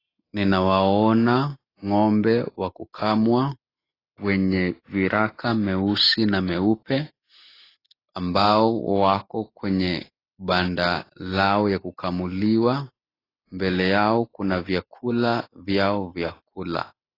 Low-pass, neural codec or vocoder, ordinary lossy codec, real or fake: 5.4 kHz; none; AAC, 24 kbps; real